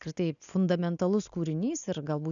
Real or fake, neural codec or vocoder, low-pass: real; none; 7.2 kHz